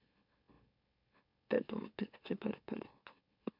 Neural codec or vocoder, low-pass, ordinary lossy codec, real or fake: autoencoder, 44.1 kHz, a latent of 192 numbers a frame, MeloTTS; 5.4 kHz; MP3, 48 kbps; fake